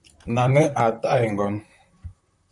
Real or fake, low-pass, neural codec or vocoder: fake; 10.8 kHz; vocoder, 44.1 kHz, 128 mel bands, Pupu-Vocoder